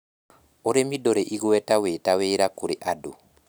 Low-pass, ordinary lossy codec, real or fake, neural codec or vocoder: none; none; real; none